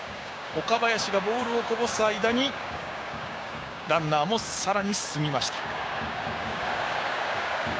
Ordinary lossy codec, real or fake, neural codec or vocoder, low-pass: none; fake; codec, 16 kHz, 6 kbps, DAC; none